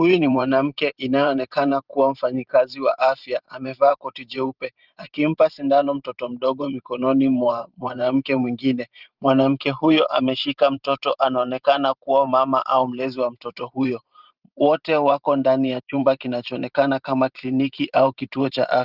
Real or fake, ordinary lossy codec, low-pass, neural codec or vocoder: fake; Opus, 32 kbps; 5.4 kHz; vocoder, 44.1 kHz, 128 mel bands every 512 samples, BigVGAN v2